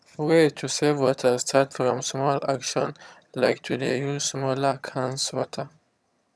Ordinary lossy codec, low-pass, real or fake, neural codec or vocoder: none; none; fake; vocoder, 22.05 kHz, 80 mel bands, HiFi-GAN